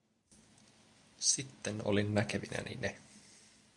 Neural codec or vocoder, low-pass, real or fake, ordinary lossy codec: none; 10.8 kHz; real; AAC, 64 kbps